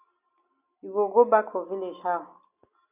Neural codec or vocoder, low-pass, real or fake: none; 3.6 kHz; real